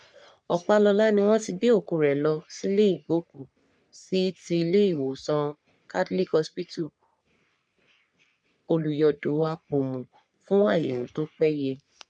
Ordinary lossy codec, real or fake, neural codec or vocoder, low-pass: none; fake; codec, 44.1 kHz, 3.4 kbps, Pupu-Codec; 9.9 kHz